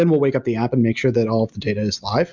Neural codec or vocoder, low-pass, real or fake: none; 7.2 kHz; real